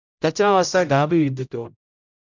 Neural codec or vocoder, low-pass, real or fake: codec, 16 kHz, 0.5 kbps, X-Codec, HuBERT features, trained on general audio; 7.2 kHz; fake